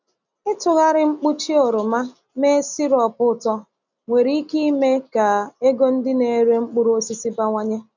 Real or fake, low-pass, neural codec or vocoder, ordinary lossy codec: real; 7.2 kHz; none; none